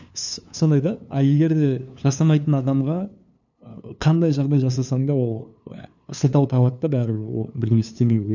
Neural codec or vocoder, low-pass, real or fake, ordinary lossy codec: codec, 16 kHz, 2 kbps, FunCodec, trained on LibriTTS, 25 frames a second; 7.2 kHz; fake; none